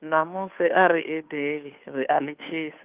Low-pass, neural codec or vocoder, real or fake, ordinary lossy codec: 3.6 kHz; codec, 16 kHz, 2 kbps, FunCodec, trained on Chinese and English, 25 frames a second; fake; Opus, 24 kbps